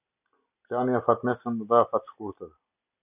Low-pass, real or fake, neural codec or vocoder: 3.6 kHz; real; none